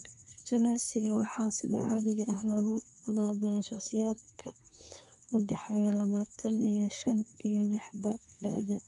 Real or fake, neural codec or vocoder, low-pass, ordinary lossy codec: fake; codec, 24 kHz, 1 kbps, SNAC; 10.8 kHz; none